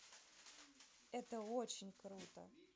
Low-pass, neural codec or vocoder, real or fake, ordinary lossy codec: none; none; real; none